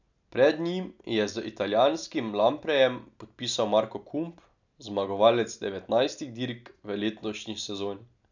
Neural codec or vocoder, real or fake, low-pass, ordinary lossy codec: none; real; 7.2 kHz; none